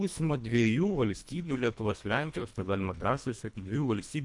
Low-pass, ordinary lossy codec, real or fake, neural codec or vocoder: 10.8 kHz; MP3, 96 kbps; fake; codec, 24 kHz, 1.5 kbps, HILCodec